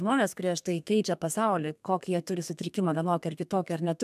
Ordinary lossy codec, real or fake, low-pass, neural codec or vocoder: MP3, 96 kbps; fake; 14.4 kHz; codec, 32 kHz, 1.9 kbps, SNAC